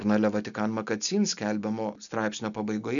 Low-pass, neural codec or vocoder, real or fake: 7.2 kHz; none; real